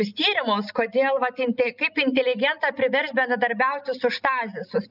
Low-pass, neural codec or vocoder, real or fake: 5.4 kHz; none; real